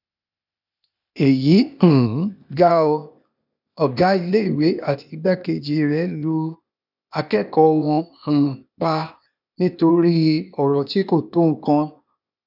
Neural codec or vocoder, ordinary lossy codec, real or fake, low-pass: codec, 16 kHz, 0.8 kbps, ZipCodec; none; fake; 5.4 kHz